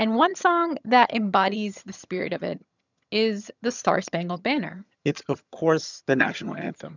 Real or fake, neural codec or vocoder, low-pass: fake; vocoder, 22.05 kHz, 80 mel bands, HiFi-GAN; 7.2 kHz